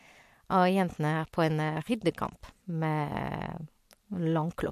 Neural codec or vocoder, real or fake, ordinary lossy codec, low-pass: none; real; MP3, 64 kbps; 14.4 kHz